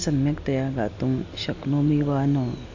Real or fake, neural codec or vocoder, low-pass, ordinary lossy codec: real; none; 7.2 kHz; AAC, 48 kbps